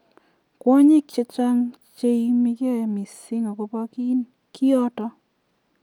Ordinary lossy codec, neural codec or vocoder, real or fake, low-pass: none; none; real; 19.8 kHz